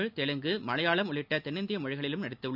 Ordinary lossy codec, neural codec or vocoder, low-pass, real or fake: none; none; 5.4 kHz; real